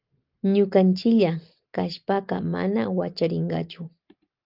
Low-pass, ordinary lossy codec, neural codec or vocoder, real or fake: 5.4 kHz; Opus, 32 kbps; none; real